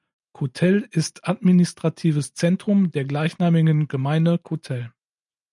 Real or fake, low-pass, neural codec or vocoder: real; 10.8 kHz; none